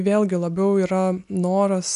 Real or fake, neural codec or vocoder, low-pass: real; none; 10.8 kHz